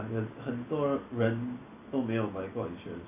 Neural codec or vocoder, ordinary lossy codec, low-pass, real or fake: none; none; 3.6 kHz; real